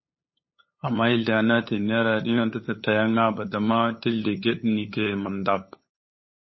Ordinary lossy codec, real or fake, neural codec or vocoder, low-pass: MP3, 24 kbps; fake; codec, 16 kHz, 8 kbps, FunCodec, trained on LibriTTS, 25 frames a second; 7.2 kHz